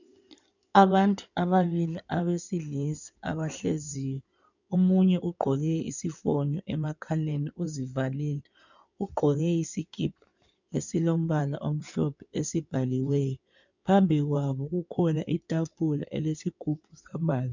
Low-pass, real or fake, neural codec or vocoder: 7.2 kHz; fake; codec, 16 kHz in and 24 kHz out, 2.2 kbps, FireRedTTS-2 codec